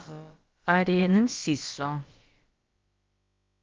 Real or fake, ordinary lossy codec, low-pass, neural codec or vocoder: fake; Opus, 24 kbps; 7.2 kHz; codec, 16 kHz, about 1 kbps, DyCAST, with the encoder's durations